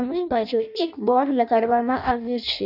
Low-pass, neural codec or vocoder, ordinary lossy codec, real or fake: 5.4 kHz; codec, 16 kHz in and 24 kHz out, 0.6 kbps, FireRedTTS-2 codec; none; fake